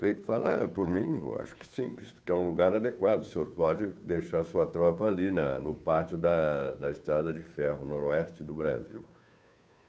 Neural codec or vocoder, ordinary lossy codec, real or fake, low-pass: codec, 16 kHz, 2 kbps, FunCodec, trained on Chinese and English, 25 frames a second; none; fake; none